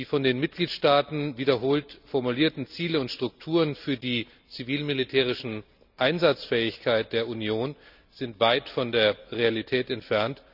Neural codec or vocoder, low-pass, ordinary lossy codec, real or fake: none; 5.4 kHz; none; real